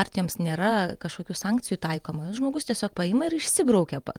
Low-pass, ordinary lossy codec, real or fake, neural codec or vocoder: 19.8 kHz; Opus, 32 kbps; fake; vocoder, 48 kHz, 128 mel bands, Vocos